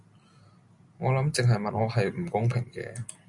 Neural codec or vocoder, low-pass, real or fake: none; 10.8 kHz; real